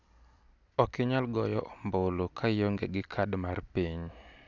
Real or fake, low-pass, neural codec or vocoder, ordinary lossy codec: real; 7.2 kHz; none; none